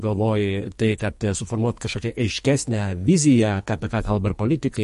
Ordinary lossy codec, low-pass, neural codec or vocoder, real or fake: MP3, 48 kbps; 14.4 kHz; codec, 44.1 kHz, 2.6 kbps, SNAC; fake